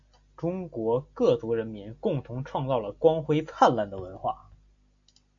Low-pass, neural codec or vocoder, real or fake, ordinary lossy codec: 7.2 kHz; none; real; AAC, 64 kbps